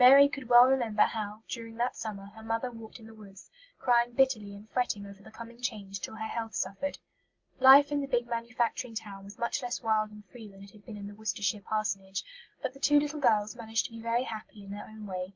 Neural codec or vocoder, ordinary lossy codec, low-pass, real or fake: none; Opus, 24 kbps; 7.2 kHz; real